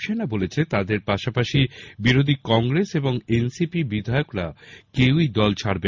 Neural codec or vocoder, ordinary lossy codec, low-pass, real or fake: none; MP3, 64 kbps; 7.2 kHz; real